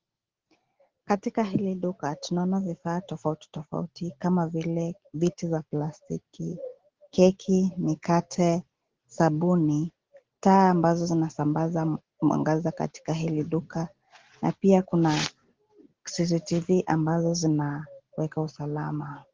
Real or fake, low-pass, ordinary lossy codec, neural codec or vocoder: real; 7.2 kHz; Opus, 16 kbps; none